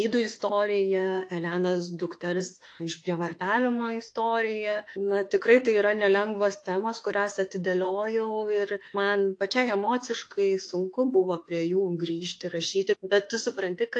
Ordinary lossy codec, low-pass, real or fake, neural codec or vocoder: AAC, 48 kbps; 10.8 kHz; fake; autoencoder, 48 kHz, 32 numbers a frame, DAC-VAE, trained on Japanese speech